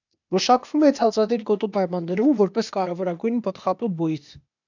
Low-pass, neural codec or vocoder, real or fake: 7.2 kHz; codec, 16 kHz, 0.8 kbps, ZipCodec; fake